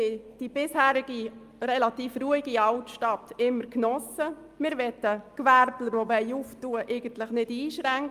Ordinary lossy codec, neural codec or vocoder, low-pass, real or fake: Opus, 32 kbps; none; 14.4 kHz; real